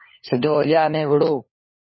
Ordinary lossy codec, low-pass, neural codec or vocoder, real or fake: MP3, 24 kbps; 7.2 kHz; codec, 16 kHz, 4 kbps, FunCodec, trained on LibriTTS, 50 frames a second; fake